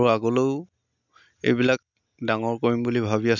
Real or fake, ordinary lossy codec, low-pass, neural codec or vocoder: real; none; 7.2 kHz; none